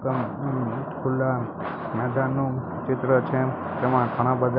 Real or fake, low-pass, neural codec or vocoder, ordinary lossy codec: real; 5.4 kHz; none; none